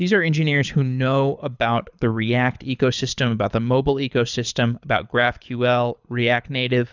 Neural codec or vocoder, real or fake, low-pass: codec, 24 kHz, 6 kbps, HILCodec; fake; 7.2 kHz